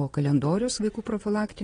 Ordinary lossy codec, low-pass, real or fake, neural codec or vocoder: AAC, 32 kbps; 9.9 kHz; fake; vocoder, 22.05 kHz, 80 mel bands, Vocos